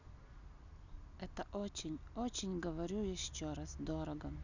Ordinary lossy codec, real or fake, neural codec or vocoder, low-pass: none; real; none; 7.2 kHz